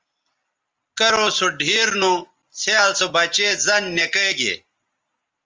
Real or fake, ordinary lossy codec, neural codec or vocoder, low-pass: real; Opus, 24 kbps; none; 7.2 kHz